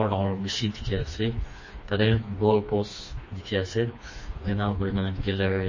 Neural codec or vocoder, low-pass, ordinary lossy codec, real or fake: codec, 16 kHz, 2 kbps, FreqCodec, smaller model; 7.2 kHz; MP3, 32 kbps; fake